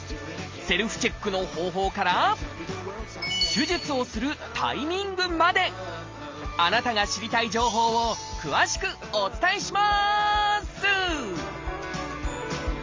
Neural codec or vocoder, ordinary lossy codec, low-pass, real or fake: none; Opus, 32 kbps; 7.2 kHz; real